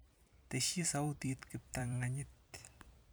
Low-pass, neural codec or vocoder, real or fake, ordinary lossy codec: none; vocoder, 44.1 kHz, 128 mel bands every 256 samples, BigVGAN v2; fake; none